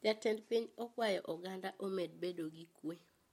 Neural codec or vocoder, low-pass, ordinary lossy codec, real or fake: none; 19.8 kHz; MP3, 64 kbps; real